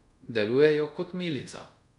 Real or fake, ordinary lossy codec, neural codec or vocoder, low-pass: fake; none; codec, 24 kHz, 0.5 kbps, DualCodec; 10.8 kHz